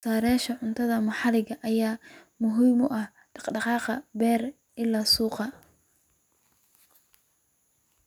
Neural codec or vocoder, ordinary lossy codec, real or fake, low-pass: none; none; real; 19.8 kHz